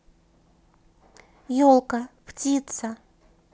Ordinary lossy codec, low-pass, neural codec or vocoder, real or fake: none; none; none; real